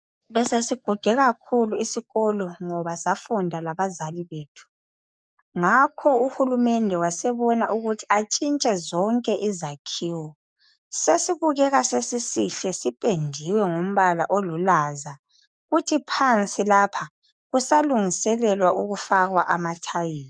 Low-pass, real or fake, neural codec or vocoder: 9.9 kHz; fake; codec, 44.1 kHz, 7.8 kbps, DAC